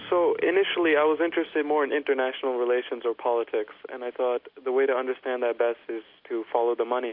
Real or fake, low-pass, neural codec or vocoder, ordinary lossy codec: real; 5.4 kHz; none; MP3, 48 kbps